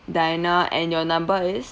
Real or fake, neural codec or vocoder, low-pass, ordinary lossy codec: real; none; none; none